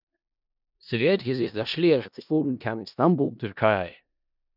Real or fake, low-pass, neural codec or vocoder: fake; 5.4 kHz; codec, 16 kHz in and 24 kHz out, 0.4 kbps, LongCat-Audio-Codec, four codebook decoder